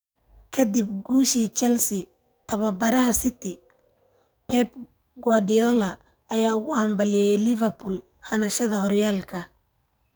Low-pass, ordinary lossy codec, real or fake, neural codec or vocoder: none; none; fake; codec, 44.1 kHz, 2.6 kbps, SNAC